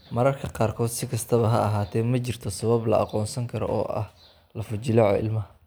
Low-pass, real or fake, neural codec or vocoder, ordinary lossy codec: none; real; none; none